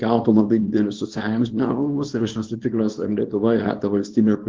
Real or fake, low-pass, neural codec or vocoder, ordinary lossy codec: fake; 7.2 kHz; codec, 24 kHz, 0.9 kbps, WavTokenizer, small release; Opus, 16 kbps